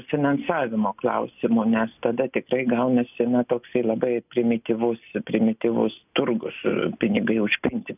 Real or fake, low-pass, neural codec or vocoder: real; 3.6 kHz; none